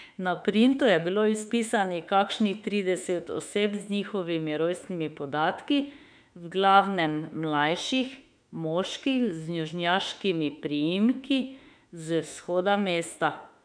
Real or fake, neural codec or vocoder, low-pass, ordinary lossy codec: fake; autoencoder, 48 kHz, 32 numbers a frame, DAC-VAE, trained on Japanese speech; 9.9 kHz; none